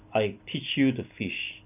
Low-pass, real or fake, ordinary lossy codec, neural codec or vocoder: 3.6 kHz; real; none; none